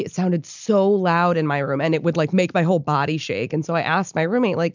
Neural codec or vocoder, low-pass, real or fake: none; 7.2 kHz; real